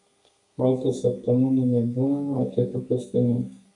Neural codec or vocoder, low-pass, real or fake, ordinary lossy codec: codec, 32 kHz, 1.9 kbps, SNAC; 10.8 kHz; fake; AAC, 64 kbps